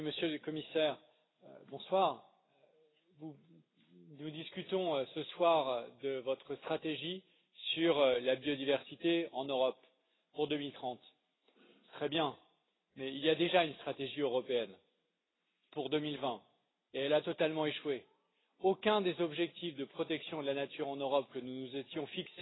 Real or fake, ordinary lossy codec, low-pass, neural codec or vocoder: real; AAC, 16 kbps; 7.2 kHz; none